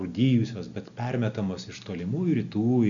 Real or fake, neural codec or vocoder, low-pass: real; none; 7.2 kHz